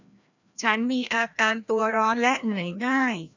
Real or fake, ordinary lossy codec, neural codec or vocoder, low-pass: fake; none; codec, 16 kHz, 1 kbps, FreqCodec, larger model; 7.2 kHz